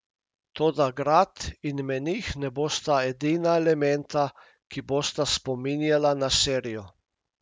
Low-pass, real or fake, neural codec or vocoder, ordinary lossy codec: none; real; none; none